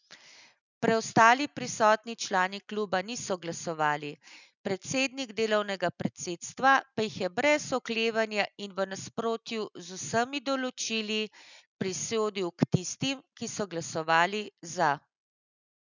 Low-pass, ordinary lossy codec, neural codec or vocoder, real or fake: 7.2 kHz; none; none; real